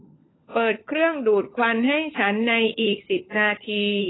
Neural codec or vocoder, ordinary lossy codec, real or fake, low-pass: codec, 16 kHz, 16 kbps, FunCodec, trained on LibriTTS, 50 frames a second; AAC, 16 kbps; fake; 7.2 kHz